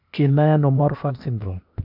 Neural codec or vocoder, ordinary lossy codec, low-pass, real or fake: codec, 16 kHz, 0.8 kbps, ZipCodec; none; 5.4 kHz; fake